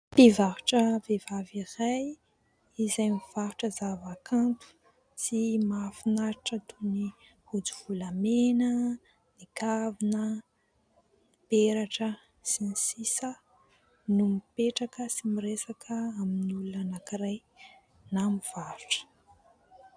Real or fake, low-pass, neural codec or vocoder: real; 9.9 kHz; none